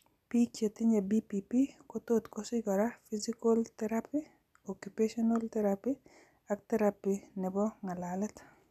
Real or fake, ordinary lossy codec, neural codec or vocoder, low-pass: real; none; none; 14.4 kHz